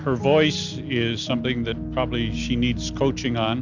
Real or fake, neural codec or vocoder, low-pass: real; none; 7.2 kHz